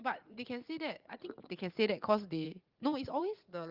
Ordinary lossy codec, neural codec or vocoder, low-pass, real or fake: Opus, 32 kbps; vocoder, 44.1 kHz, 80 mel bands, Vocos; 5.4 kHz; fake